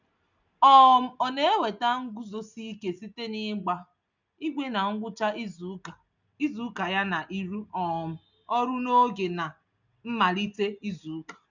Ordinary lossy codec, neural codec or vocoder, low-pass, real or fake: none; none; 7.2 kHz; real